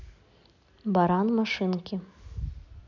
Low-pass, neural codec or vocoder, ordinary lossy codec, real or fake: 7.2 kHz; none; none; real